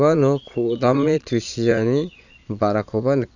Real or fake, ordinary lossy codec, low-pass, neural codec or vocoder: fake; none; 7.2 kHz; vocoder, 22.05 kHz, 80 mel bands, Vocos